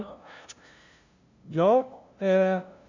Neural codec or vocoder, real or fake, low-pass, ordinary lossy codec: codec, 16 kHz, 0.5 kbps, FunCodec, trained on LibriTTS, 25 frames a second; fake; 7.2 kHz; Opus, 64 kbps